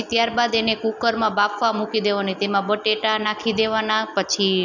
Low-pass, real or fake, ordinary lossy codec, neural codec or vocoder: 7.2 kHz; real; none; none